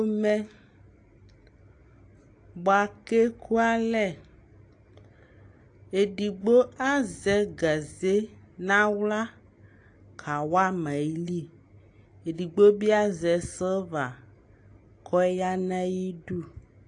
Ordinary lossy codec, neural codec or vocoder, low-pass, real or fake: AAC, 48 kbps; none; 9.9 kHz; real